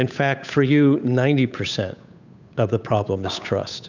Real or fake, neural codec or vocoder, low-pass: fake; codec, 16 kHz, 8 kbps, FunCodec, trained on Chinese and English, 25 frames a second; 7.2 kHz